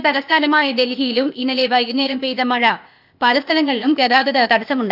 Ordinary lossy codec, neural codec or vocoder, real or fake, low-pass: none; codec, 16 kHz, 0.8 kbps, ZipCodec; fake; 5.4 kHz